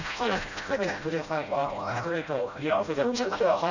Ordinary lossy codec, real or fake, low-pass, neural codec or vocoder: none; fake; 7.2 kHz; codec, 16 kHz, 0.5 kbps, FreqCodec, smaller model